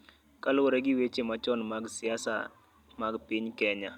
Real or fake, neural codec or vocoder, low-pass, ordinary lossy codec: real; none; 19.8 kHz; none